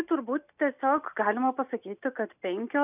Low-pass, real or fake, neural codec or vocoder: 3.6 kHz; real; none